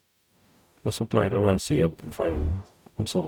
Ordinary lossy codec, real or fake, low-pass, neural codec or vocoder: none; fake; 19.8 kHz; codec, 44.1 kHz, 0.9 kbps, DAC